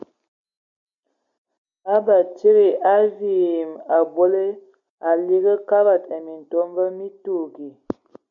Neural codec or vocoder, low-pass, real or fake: none; 7.2 kHz; real